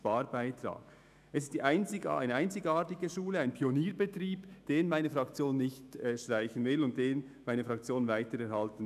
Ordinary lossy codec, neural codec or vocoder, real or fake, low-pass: none; autoencoder, 48 kHz, 128 numbers a frame, DAC-VAE, trained on Japanese speech; fake; 14.4 kHz